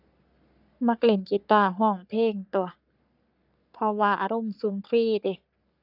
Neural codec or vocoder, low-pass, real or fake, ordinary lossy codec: codec, 44.1 kHz, 3.4 kbps, Pupu-Codec; 5.4 kHz; fake; none